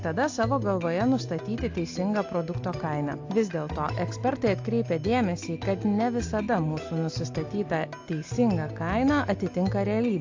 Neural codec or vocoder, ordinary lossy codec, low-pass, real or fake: none; AAC, 48 kbps; 7.2 kHz; real